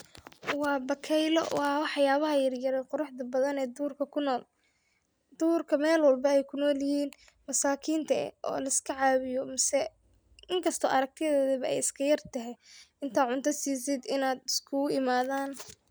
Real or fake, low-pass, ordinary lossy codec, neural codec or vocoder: real; none; none; none